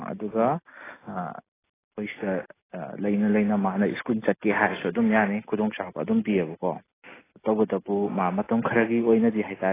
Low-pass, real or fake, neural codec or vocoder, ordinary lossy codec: 3.6 kHz; real; none; AAC, 16 kbps